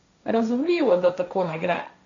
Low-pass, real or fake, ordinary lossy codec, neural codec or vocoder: 7.2 kHz; fake; none; codec, 16 kHz, 1.1 kbps, Voila-Tokenizer